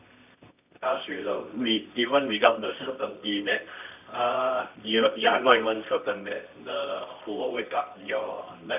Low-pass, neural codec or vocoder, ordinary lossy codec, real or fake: 3.6 kHz; codec, 24 kHz, 0.9 kbps, WavTokenizer, medium music audio release; none; fake